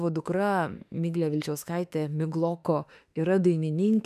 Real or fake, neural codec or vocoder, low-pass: fake; autoencoder, 48 kHz, 32 numbers a frame, DAC-VAE, trained on Japanese speech; 14.4 kHz